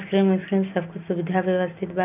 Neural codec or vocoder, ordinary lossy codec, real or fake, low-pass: none; none; real; 3.6 kHz